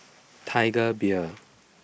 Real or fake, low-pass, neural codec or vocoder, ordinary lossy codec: real; none; none; none